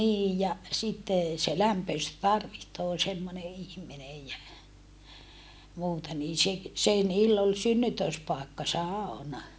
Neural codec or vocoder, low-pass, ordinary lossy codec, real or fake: none; none; none; real